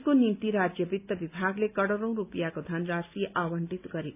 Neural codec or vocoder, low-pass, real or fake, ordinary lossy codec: none; 3.6 kHz; real; none